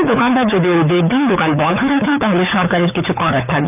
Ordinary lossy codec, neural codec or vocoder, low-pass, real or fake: none; codec, 24 kHz, 6 kbps, HILCodec; 3.6 kHz; fake